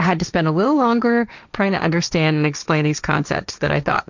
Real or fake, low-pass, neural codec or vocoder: fake; 7.2 kHz; codec, 16 kHz, 1.1 kbps, Voila-Tokenizer